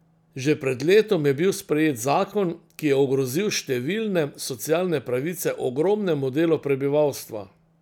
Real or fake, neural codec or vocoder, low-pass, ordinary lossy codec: real; none; 19.8 kHz; none